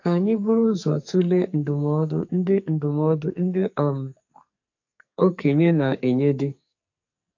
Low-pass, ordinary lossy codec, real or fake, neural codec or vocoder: 7.2 kHz; AAC, 48 kbps; fake; codec, 44.1 kHz, 2.6 kbps, SNAC